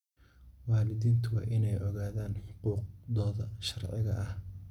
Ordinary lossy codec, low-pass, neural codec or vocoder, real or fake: none; 19.8 kHz; none; real